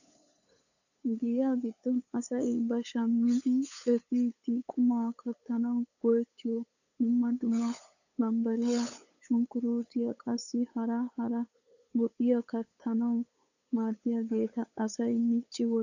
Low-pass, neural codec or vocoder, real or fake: 7.2 kHz; codec, 16 kHz, 8 kbps, FunCodec, trained on LibriTTS, 25 frames a second; fake